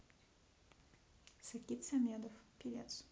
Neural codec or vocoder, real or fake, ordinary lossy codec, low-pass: none; real; none; none